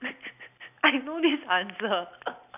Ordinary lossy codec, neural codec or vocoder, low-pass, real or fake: none; none; 3.6 kHz; real